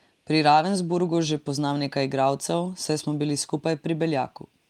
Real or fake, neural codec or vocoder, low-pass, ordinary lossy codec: real; none; 19.8 kHz; Opus, 32 kbps